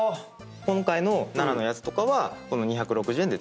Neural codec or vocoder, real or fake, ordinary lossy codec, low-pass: none; real; none; none